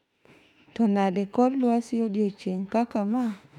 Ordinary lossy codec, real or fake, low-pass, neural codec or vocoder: none; fake; 19.8 kHz; autoencoder, 48 kHz, 32 numbers a frame, DAC-VAE, trained on Japanese speech